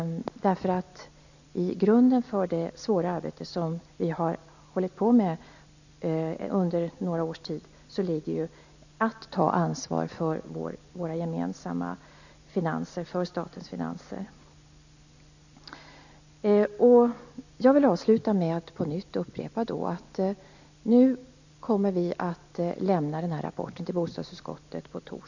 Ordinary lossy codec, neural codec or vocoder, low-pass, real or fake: none; none; 7.2 kHz; real